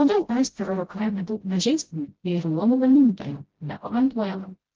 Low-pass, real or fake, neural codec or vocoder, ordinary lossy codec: 7.2 kHz; fake; codec, 16 kHz, 0.5 kbps, FreqCodec, smaller model; Opus, 16 kbps